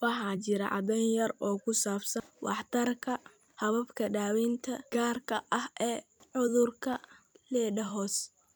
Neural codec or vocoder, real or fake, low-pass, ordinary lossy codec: vocoder, 44.1 kHz, 128 mel bands every 256 samples, BigVGAN v2; fake; none; none